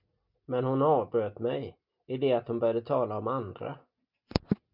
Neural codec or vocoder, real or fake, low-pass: none; real; 5.4 kHz